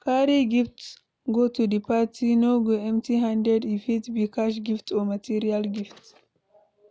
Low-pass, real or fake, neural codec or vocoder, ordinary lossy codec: none; real; none; none